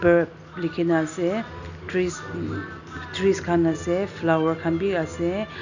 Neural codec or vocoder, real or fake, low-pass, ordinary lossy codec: none; real; 7.2 kHz; none